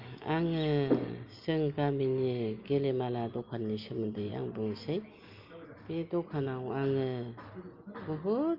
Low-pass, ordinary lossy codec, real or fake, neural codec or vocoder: 5.4 kHz; Opus, 24 kbps; real; none